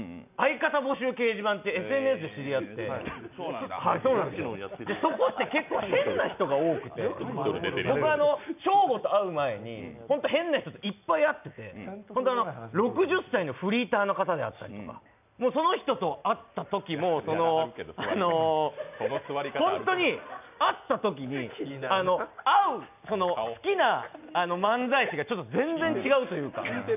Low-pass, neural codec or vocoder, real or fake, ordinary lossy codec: 3.6 kHz; none; real; none